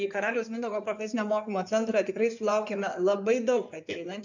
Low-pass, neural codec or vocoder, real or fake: 7.2 kHz; codec, 16 kHz in and 24 kHz out, 2.2 kbps, FireRedTTS-2 codec; fake